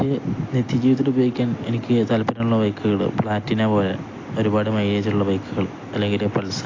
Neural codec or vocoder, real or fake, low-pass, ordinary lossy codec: none; real; 7.2 kHz; AAC, 32 kbps